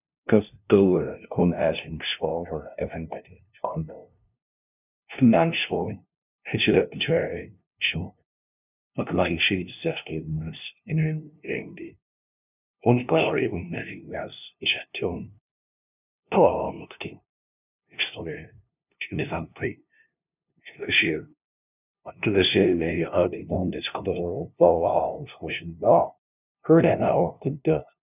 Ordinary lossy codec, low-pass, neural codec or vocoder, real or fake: none; 3.6 kHz; codec, 16 kHz, 0.5 kbps, FunCodec, trained on LibriTTS, 25 frames a second; fake